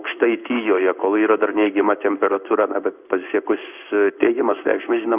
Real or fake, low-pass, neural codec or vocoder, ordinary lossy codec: fake; 3.6 kHz; codec, 16 kHz in and 24 kHz out, 1 kbps, XY-Tokenizer; Opus, 64 kbps